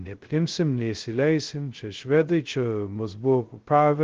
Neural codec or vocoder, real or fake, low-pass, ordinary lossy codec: codec, 16 kHz, 0.2 kbps, FocalCodec; fake; 7.2 kHz; Opus, 16 kbps